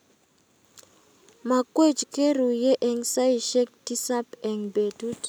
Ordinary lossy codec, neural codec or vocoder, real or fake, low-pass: none; none; real; none